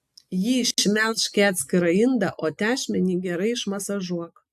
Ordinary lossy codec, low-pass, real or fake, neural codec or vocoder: AAC, 96 kbps; 14.4 kHz; real; none